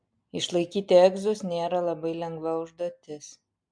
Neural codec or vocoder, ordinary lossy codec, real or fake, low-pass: none; MP3, 64 kbps; real; 9.9 kHz